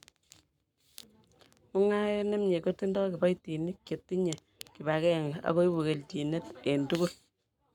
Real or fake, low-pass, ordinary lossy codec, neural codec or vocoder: fake; 19.8 kHz; none; codec, 44.1 kHz, 7.8 kbps, Pupu-Codec